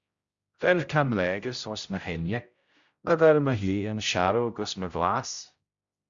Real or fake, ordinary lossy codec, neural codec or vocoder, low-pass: fake; Opus, 64 kbps; codec, 16 kHz, 0.5 kbps, X-Codec, HuBERT features, trained on general audio; 7.2 kHz